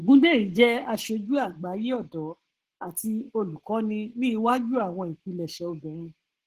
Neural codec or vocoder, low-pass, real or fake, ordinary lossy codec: codec, 44.1 kHz, 7.8 kbps, Pupu-Codec; 14.4 kHz; fake; Opus, 16 kbps